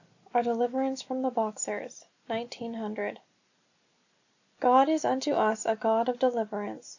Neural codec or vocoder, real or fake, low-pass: none; real; 7.2 kHz